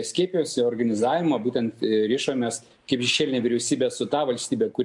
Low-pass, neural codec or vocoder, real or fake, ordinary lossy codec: 10.8 kHz; none; real; MP3, 64 kbps